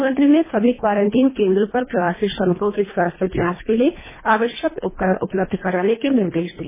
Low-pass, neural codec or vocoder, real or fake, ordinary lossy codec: 3.6 kHz; codec, 24 kHz, 1.5 kbps, HILCodec; fake; MP3, 16 kbps